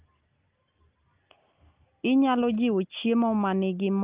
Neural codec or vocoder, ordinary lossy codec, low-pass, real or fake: none; Opus, 32 kbps; 3.6 kHz; real